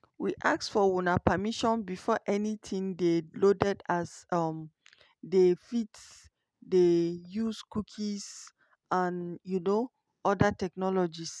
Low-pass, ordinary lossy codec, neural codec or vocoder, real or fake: none; none; none; real